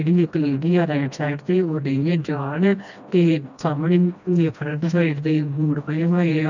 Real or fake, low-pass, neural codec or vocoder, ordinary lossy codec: fake; 7.2 kHz; codec, 16 kHz, 1 kbps, FreqCodec, smaller model; none